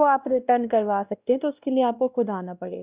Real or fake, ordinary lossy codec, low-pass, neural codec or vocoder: fake; Opus, 64 kbps; 3.6 kHz; codec, 16 kHz, 1 kbps, X-Codec, WavLM features, trained on Multilingual LibriSpeech